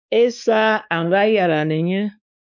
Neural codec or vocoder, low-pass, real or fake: codec, 16 kHz, 2 kbps, X-Codec, WavLM features, trained on Multilingual LibriSpeech; 7.2 kHz; fake